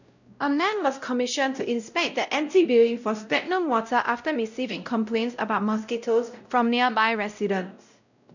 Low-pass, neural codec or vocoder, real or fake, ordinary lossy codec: 7.2 kHz; codec, 16 kHz, 0.5 kbps, X-Codec, WavLM features, trained on Multilingual LibriSpeech; fake; none